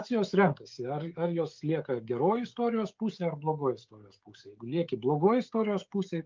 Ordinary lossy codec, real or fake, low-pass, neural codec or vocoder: Opus, 32 kbps; fake; 7.2 kHz; codec, 16 kHz, 16 kbps, FreqCodec, smaller model